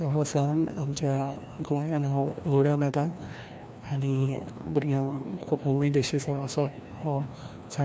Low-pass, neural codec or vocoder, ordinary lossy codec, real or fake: none; codec, 16 kHz, 1 kbps, FreqCodec, larger model; none; fake